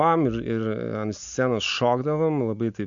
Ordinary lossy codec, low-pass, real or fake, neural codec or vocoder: AAC, 64 kbps; 7.2 kHz; real; none